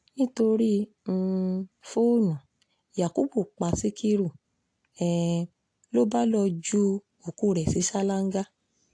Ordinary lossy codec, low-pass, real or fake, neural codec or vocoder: AAC, 48 kbps; 9.9 kHz; real; none